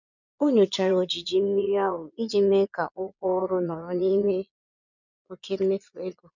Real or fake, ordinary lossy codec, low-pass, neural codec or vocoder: fake; none; 7.2 kHz; vocoder, 22.05 kHz, 80 mel bands, Vocos